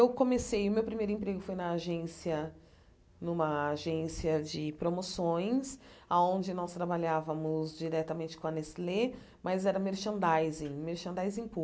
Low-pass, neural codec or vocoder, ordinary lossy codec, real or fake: none; none; none; real